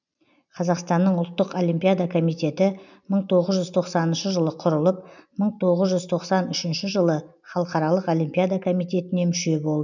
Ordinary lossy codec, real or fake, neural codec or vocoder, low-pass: none; real; none; 7.2 kHz